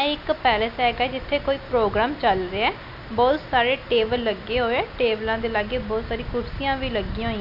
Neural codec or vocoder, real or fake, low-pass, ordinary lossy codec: none; real; 5.4 kHz; none